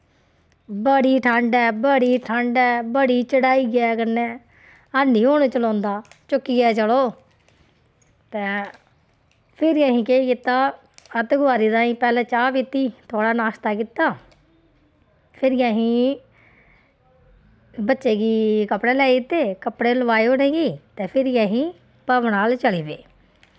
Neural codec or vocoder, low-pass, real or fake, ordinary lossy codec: none; none; real; none